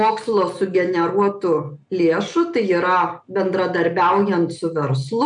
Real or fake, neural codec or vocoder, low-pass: real; none; 9.9 kHz